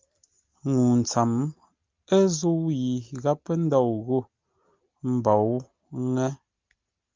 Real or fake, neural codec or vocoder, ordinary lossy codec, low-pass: real; none; Opus, 24 kbps; 7.2 kHz